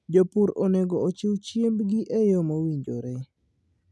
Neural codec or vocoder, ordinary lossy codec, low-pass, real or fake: none; none; none; real